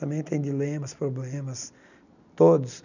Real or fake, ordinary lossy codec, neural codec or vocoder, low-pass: real; none; none; 7.2 kHz